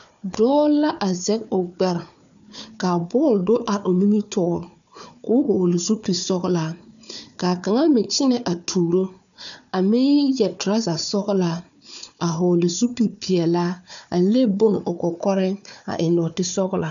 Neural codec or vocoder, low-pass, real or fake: codec, 16 kHz, 4 kbps, FunCodec, trained on Chinese and English, 50 frames a second; 7.2 kHz; fake